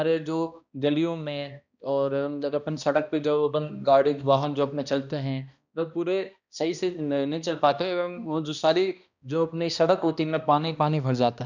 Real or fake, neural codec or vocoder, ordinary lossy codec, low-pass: fake; codec, 16 kHz, 1 kbps, X-Codec, HuBERT features, trained on balanced general audio; none; 7.2 kHz